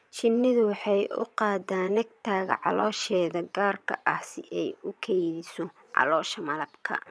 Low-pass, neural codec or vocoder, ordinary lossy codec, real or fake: none; vocoder, 22.05 kHz, 80 mel bands, Vocos; none; fake